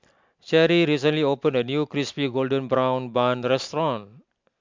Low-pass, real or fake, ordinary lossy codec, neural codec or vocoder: 7.2 kHz; real; MP3, 64 kbps; none